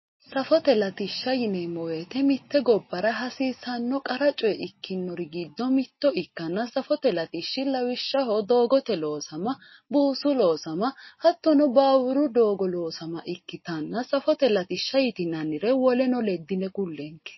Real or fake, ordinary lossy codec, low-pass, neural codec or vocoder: real; MP3, 24 kbps; 7.2 kHz; none